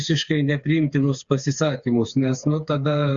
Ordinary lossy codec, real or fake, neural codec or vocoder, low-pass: Opus, 64 kbps; fake; codec, 16 kHz, 4 kbps, FreqCodec, smaller model; 7.2 kHz